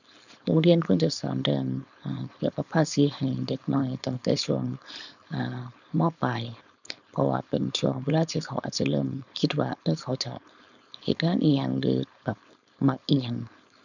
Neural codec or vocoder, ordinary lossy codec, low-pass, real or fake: codec, 16 kHz, 4.8 kbps, FACodec; none; 7.2 kHz; fake